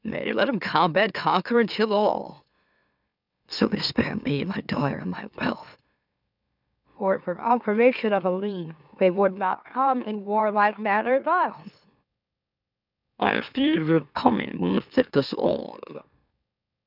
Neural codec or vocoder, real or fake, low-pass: autoencoder, 44.1 kHz, a latent of 192 numbers a frame, MeloTTS; fake; 5.4 kHz